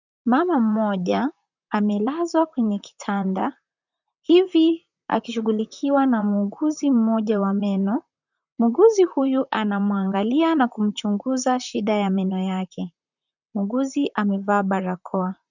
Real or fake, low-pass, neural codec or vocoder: fake; 7.2 kHz; vocoder, 44.1 kHz, 128 mel bands, Pupu-Vocoder